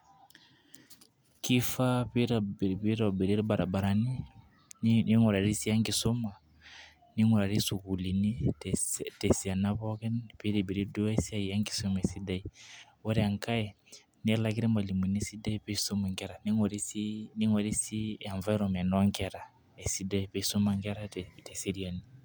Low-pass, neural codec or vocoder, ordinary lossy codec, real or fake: none; none; none; real